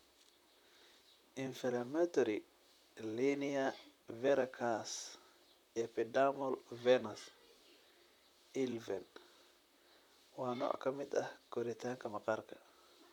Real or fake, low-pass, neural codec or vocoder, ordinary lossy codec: fake; 19.8 kHz; vocoder, 44.1 kHz, 128 mel bands, Pupu-Vocoder; none